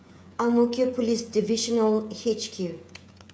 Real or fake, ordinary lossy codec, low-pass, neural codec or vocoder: fake; none; none; codec, 16 kHz, 16 kbps, FreqCodec, smaller model